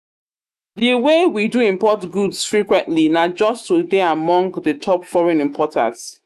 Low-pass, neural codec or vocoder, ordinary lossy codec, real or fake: 14.4 kHz; autoencoder, 48 kHz, 128 numbers a frame, DAC-VAE, trained on Japanese speech; none; fake